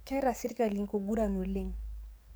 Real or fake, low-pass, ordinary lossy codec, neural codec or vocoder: fake; none; none; codec, 44.1 kHz, 7.8 kbps, DAC